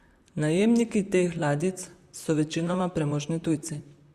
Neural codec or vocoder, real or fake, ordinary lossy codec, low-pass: vocoder, 44.1 kHz, 128 mel bands, Pupu-Vocoder; fake; Opus, 64 kbps; 14.4 kHz